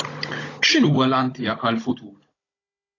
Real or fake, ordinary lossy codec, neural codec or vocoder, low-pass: fake; AAC, 32 kbps; codec, 16 kHz, 16 kbps, FunCodec, trained on Chinese and English, 50 frames a second; 7.2 kHz